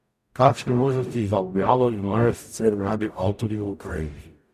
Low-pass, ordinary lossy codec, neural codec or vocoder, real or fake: 14.4 kHz; none; codec, 44.1 kHz, 0.9 kbps, DAC; fake